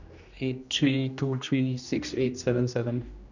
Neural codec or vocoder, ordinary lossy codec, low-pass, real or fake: codec, 16 kHz, 1 kbps, X-Codec, HuBERT features, trained on general audio; none; 7.2 kHz; fake